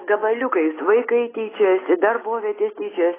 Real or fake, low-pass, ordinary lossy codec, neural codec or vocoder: real; 3.6 kHz; AAC, 16 kbps; none